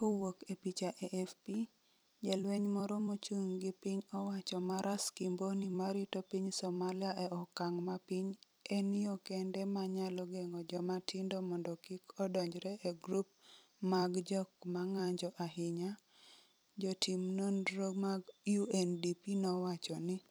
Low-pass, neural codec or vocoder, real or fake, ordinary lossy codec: none; vocoder, 44.1 kHz, 128 mel bands every 256 samples, BigVGAN v2; fake; none